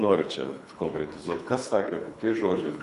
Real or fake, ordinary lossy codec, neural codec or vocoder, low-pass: fake; MP3, 96 kbps; codec, 24 kHz, 3 kbps, HILCodec; 10.8 kHz